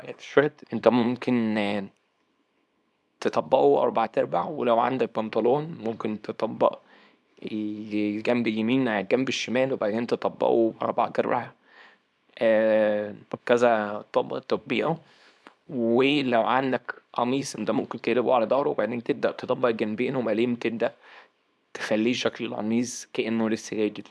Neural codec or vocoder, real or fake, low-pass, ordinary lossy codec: codec, 24 kHz, 0.9 kbps, WavTokenizer, small release; fake; none; none